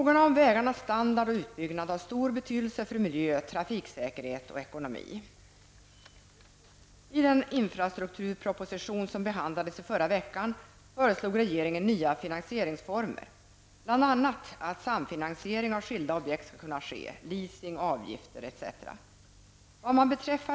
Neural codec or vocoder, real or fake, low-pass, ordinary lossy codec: none; real; none; none